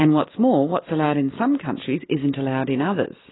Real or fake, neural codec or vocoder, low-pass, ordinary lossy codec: real; none; 7.2 kHz; AAC, 16 kbps